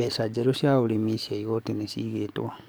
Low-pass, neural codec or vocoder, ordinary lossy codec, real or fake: none; codec, 44.1 kHz, 7.8 kbps, DAC; none; fake